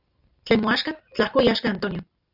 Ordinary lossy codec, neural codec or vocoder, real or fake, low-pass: Opus, 64 kbps; none; real; 5.4 kHz